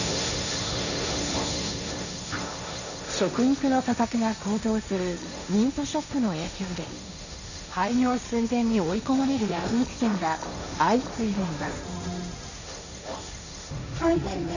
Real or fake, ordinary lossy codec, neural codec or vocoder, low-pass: fake; none; codec, 16 kHz, 1.1 kbps, Voila-Tokenizer; 7.2 kHz